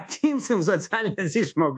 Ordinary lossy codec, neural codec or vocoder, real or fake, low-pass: AAC, 64 kbps; codec, 24 kHz, 1.2 kbps, DualCodec; fake; 10.8 kHz